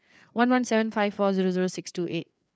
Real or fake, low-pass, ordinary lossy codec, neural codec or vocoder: fake; none; none; codec, 16 kHz, 4 kbps, FreqCodec, larger model